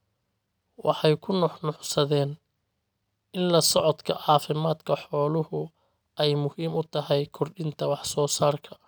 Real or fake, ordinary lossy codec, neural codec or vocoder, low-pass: real; none; none; none